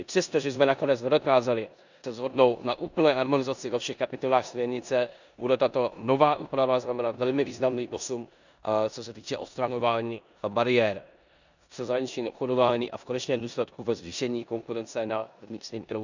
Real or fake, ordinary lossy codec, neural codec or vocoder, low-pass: fake; none; codec, 16 kHz in and 24 kHz out, 0.9 kbps, LongCat-Audio-Codec, four codebook decoder; 7.2 kHz